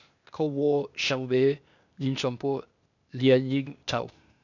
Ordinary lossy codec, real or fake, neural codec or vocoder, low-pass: none; fake; codec, 16 kHz, 0.8 kbps, ZipCodec; 7.2 kHz